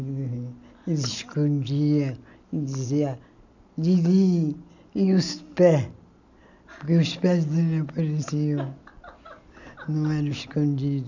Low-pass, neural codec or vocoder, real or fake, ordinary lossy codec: 7.2 kHz; none; real; none